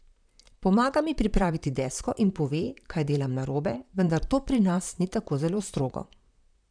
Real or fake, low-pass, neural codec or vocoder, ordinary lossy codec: fake; 9.9 kHz; vocoder, 44.1 kHz, 128 mel bands, Pupu-Vocoder; none